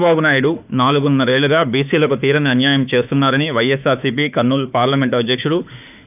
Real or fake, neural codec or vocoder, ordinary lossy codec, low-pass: fake; autoencoder, 48 kHz, 32 numbers a frame, DAC-VAE, trained on Japanese speech; none; 3.6 kHz